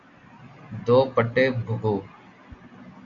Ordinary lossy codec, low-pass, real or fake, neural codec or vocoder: Opus, 64 kbps; 7.2 kHz; real; none